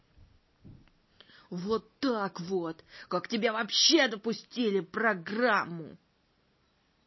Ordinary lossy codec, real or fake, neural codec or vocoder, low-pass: MP3, 24 kbps; real; none; 7.2 kHz